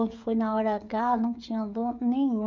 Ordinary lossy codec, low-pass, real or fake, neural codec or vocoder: MP3, 64 kbps; 7.2 kHz; fake; codec, 16 kHz, 16 kbps, FreqCodec, smaller model